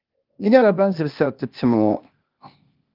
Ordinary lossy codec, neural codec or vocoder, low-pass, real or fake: Opus, 24 kbps; codec, 16 kHz, 0.8 kbps, ZipCodec; 5.4 kHz; fake